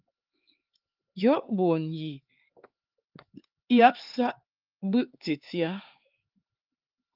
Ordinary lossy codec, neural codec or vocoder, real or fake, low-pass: Opus, 32 kbps; codec, 16 kHz, 4 kbps, X-Codec, HuBERT features, trained on LibriSpeech; fake; 5.4 kHz